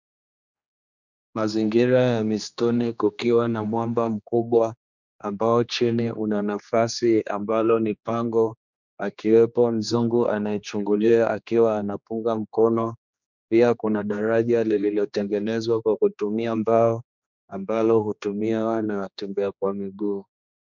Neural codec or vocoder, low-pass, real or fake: codec, 16 kHz, 2 kbps, X-Codec, HuBERT features, trained on general audio; 7.2 kHz; fake